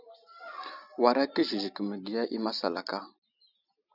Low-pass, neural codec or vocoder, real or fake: 5.4 kHz; none; real